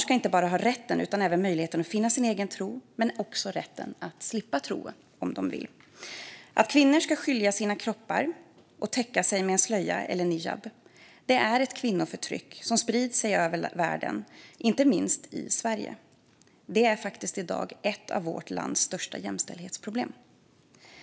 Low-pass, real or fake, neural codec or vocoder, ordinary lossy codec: none; real; none; none